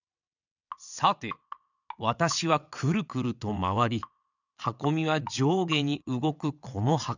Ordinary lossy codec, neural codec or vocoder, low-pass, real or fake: none; vocoder, 22.05 kHz, 80 mel bands, WaveNeXt; 7.2 kHz; fake